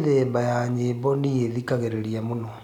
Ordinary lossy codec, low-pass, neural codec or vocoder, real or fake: none; 19.8 kHz; none; real